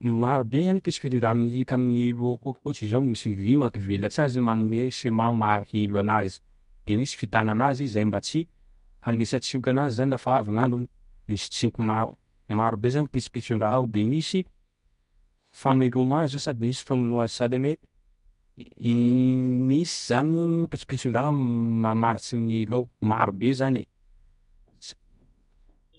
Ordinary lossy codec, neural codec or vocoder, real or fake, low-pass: MP3, 64 kbps; codec, 24 kHz, 0.9 kbps, WavTokenizer, medium music audio release; fake; 10.8 kHz